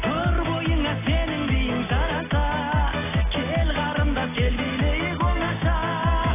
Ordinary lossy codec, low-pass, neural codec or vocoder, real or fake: AAC, 16 kbps; 3.6 kHz; none; real